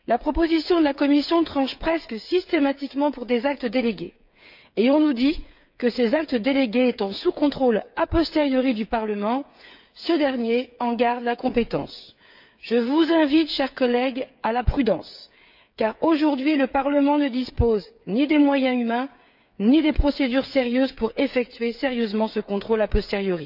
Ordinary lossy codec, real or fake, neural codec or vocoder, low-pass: none; fake; codec, 16 kHz, 8 kbps, FreqCodec, smaller model; 5.4 kHz